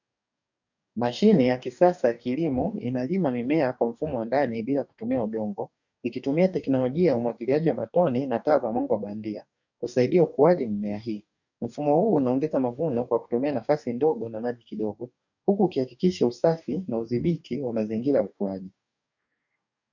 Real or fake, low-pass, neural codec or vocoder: fake; 7.2 kHz; codec, 44.1 kHz, 2.6 kbps, DAC